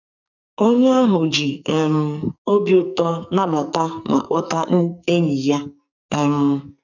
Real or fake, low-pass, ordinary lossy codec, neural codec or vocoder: fake; 7.2 kHz; none; codec, 44.1 kHz, 2.6 kbps, SNAC